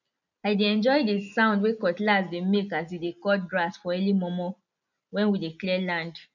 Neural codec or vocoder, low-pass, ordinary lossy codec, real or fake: none; 7.2 kHz; none; real